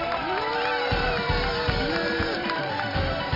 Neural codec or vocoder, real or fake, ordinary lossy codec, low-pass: vocoder, 44.1 kHz, 128 mel bands every 512 samples, BigVGAN v2; fake; MP3, 32 kbps; 5.4 kHz